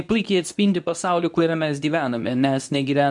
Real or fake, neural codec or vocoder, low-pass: fake; codec, 24 kHz, 0.9 kbps, WavTokenizer, medium speech release version 2; 10.8 kHz